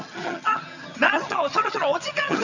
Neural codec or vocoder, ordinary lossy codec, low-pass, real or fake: vocoder, 22.05 kHz, 80 mel bands, HiFi-GAN; none; 7.2 kHz; fake